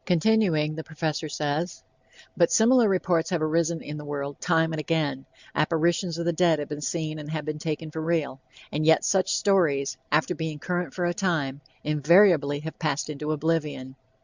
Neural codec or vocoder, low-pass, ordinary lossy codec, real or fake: none; 7.2 kHz; Opus, 64 kbps; real